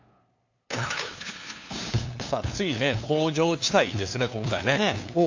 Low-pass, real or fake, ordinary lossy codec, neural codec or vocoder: 7.2 kHz; fake; AAC, 48 kbps; codec, 16 kHz, 2 kbps, FunCodec, trained on LibriTTS, 25 frames a second